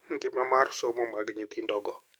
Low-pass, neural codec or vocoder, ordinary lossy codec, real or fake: none; codec, 44.1 kHz, 7.8 kbps, DAC; none; fake